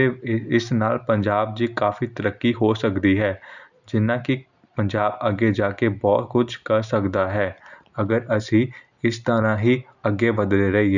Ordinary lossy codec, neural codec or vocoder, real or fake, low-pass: none; none; real; 7.2 kHz